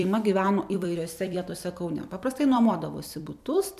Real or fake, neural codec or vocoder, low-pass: fake; vocoder, 44.1 kHz, 128 mel bands every 256 samples, BigVGAN v2; 14.4 kHz